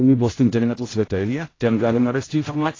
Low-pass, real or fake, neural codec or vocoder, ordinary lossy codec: 7.2 kHz; fake; codec, 16 kHz, 0.5 kbps, X-Codec, HuBERT features, trained on general audio; AAC, 32 kbps